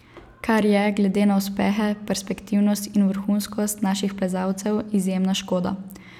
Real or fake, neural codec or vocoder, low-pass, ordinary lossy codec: real; none; 19.8 kHz; none